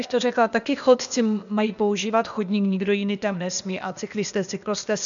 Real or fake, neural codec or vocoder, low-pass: fake; codec, 16 kHz, 0.8 kbps, ZipCodec; 7.2 kHz